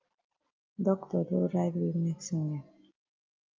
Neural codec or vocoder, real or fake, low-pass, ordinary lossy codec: none; real; 7.2 kHz; Opus, 24 kbps